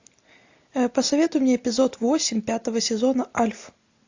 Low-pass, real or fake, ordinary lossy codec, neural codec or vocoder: 7.2 kHz; real; AAC, 48 kbps; none